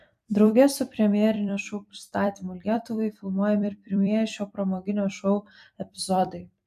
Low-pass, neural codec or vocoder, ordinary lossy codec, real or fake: 14.4 kHz; vocoder, 44.1 kHz, 128 mel bands every 256 samples, BigVGAN v2; AAC, 96 kbps; fake